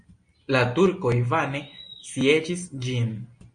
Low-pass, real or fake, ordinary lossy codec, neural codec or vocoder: 9.9 kHz; real; MP3, 48 kbps; none